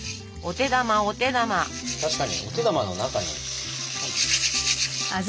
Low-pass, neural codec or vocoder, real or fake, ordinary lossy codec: none; none; real; none